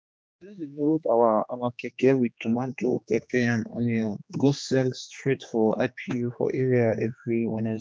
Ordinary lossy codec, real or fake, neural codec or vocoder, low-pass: none; fake; codec, 16 kHz, 2 kbps, X-Codec, HuBERT features, trained on general audio; none